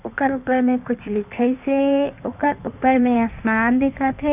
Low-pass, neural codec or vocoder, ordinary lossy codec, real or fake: 3.6 kHz; codec, 16 kHz in and 24 kHz out, 1.1 kbps, FireRedTTS-2 codec; none; fake